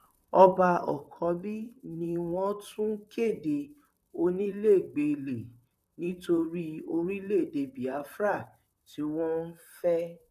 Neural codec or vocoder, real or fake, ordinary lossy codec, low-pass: vocoder, 44.1 kHz, 128 mel bands, Pupu-Vocoder; fake; none; 14.4 kHz